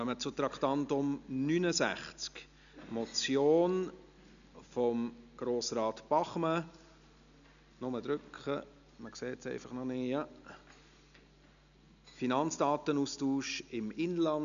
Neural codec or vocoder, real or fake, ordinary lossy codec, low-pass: none; real; none; 7.2 kHz